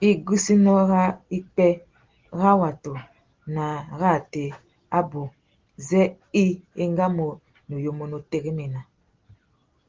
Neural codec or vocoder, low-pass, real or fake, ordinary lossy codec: none; 7.2 kHz; real; Opus, 16 kbps